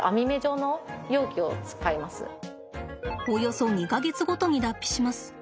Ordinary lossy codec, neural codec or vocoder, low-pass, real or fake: none; none; none; real